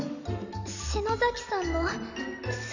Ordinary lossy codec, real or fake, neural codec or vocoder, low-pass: none; real; none; 7.2 kHz